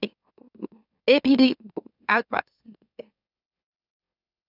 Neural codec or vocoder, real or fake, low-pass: autoencoder, 44.1 kHz, a latent of 192 numbers a frame, MeloTTS; fake; 5.4 kHz